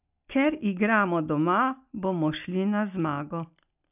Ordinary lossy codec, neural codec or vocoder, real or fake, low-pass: none; none; real; 3.6 kHz